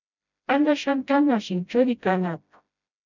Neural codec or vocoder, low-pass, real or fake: codec, 16 kHz, 0.5 kbps, FreqCodec, smaller model; 7.2 kHz; fake